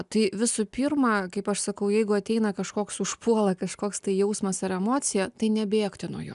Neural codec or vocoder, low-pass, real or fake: none; 10.8 kHz; real